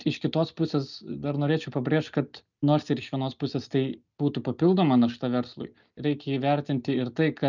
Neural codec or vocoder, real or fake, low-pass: none; real; 7.2 kHz